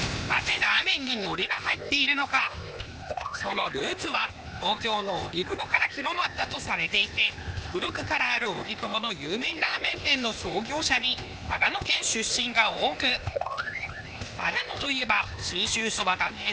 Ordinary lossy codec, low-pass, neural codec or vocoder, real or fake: none; none; codec, 16 kHz, 0.8 kbps, ZipCodec; fake